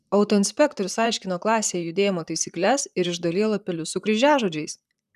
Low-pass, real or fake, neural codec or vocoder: 14.4 kHz; fake; vocoder, 44.1 kHz, 128 mel bands, Pupu-Vocoder